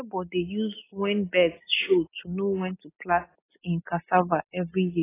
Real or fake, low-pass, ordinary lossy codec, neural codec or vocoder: real; 3.6 kHz; AAC, 16 kbps; none